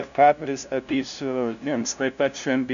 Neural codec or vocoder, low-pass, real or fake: codec, 16 kHz, 0.5 kbps, FunCodec, trained on LibriTTS, 25 frames a second; 7.2 kHz; fake